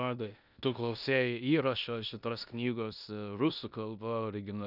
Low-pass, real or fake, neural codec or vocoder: 5.4 kHz; fake; codec, 16 kHz in and 24 kHz out, 0.9 kbps, LongCat-Audio-Codec, four codebook decoder